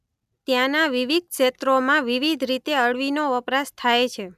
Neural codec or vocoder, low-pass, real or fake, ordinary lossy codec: none; 14.4 kHz; real; none